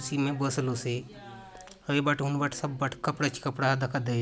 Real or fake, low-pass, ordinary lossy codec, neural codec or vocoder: real; none; none; none